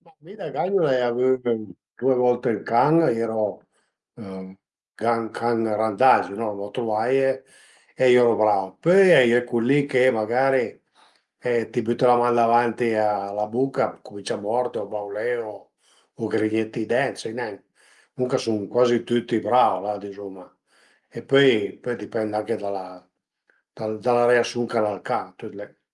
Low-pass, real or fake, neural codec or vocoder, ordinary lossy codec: 10.8 kHz; real; none; Opus, 32 kbps